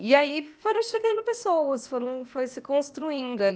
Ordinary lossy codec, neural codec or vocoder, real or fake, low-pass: none; codec, 16 kHz, 0.8 kbps, ZipCodec; fake; none